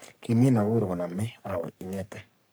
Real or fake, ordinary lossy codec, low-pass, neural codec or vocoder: fake; none; none; codec, 44.1 kHz, 3.4 kbps, Pupu-Codec